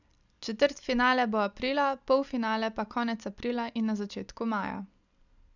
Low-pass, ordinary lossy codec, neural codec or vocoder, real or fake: 7.2 kHz; none; none; real